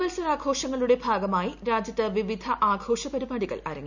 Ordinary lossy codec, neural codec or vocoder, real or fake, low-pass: none; none; real; 7.2 kHz